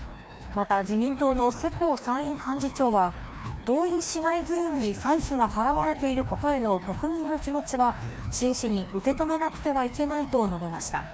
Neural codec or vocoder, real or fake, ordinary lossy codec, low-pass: codec, 16 kHz, 1 kbps, FreqCodec, larger model; fake; none; none